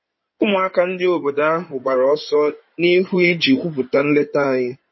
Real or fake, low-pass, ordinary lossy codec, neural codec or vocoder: fake; 7.2 kHz; MP3, 24 kbps; codec, 16 kHz in and 24 kHz out, 2.2 kbps, FireRedTTS-2 codec